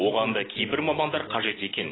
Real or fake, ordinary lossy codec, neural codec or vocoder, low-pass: real; AAC, 16 kbps; none; 7.2 kHz